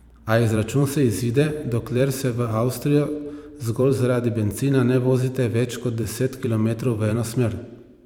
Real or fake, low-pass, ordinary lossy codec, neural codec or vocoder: fake; 19.8 kHz; none; vocoder, 44.1 kHz, 128 mel bands every 512 samples, BigVGAN v2